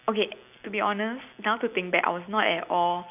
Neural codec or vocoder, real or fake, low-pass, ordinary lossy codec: none; real; 3.6 kHz; none